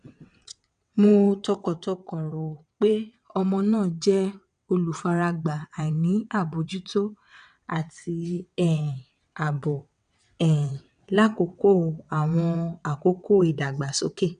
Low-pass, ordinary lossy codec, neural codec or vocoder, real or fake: 9.9 kHz; none; vocoder, 22.05 kHz, 80 mel bands, WaveNeXt; fake